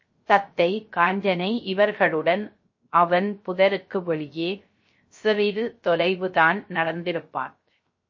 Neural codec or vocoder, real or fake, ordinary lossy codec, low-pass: codec, 16 kHz, 0.3 kbps, FocalCodec; fake; MP3, 32 kbps; 7.2 kHz